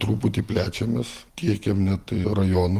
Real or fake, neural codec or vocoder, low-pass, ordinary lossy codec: real; none; 14.4 kHz; Opus, 32 kbps